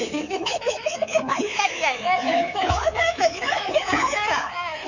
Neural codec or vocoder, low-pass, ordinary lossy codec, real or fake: codec, 24 kHz, 3.1 kbps, DualCodec; 7.2 kHz; none; fake